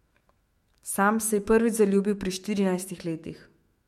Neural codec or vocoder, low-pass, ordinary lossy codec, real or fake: autoencoder, 48 kHz, 128 numbers a frame, DAC-VAE, trained on Japanese speech; 19.8 kHz; MP3, 64 kbps; fake